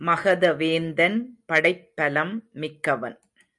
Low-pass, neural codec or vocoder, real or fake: 10.8 kHz; none; real